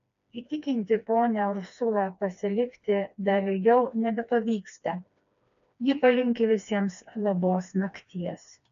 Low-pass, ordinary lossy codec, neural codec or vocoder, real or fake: 7.2 kHz; MP3, 64 kbps; codec, 16 kHz, 2 kbps, FreqCodec, smaller model; fake